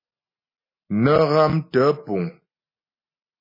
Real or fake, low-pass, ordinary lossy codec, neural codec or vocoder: real; 5.4 kHz; MP3, 24 kbps; none